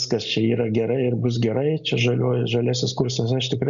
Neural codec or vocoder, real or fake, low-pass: none; real; 7.2 kHz